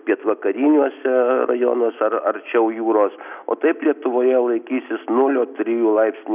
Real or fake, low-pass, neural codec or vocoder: real; 3.6 kHz; none